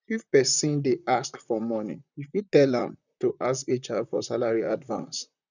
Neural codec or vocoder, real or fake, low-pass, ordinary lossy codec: vocoder, 44.1 kHz, 128 mel bands, Pupu-Vocoder; fake; 7.2 kHz; none